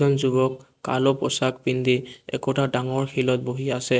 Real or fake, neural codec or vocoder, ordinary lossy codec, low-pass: real; none; none; none